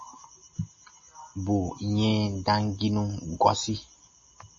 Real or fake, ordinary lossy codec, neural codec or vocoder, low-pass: real; MP3, 32 kbps; none; 7.2 kHz